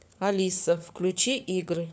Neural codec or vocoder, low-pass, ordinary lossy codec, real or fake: codec, 16 kHz, 4 kbps, FunCodec, trained on LibriTTS, 50 frames a second; none; none; fake